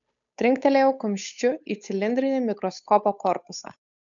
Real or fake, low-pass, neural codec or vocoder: fake; 7.2 kHz; codec, 16 kHz, 8 kbps, FunCodec, trained on Chinese and English, 25 frames a second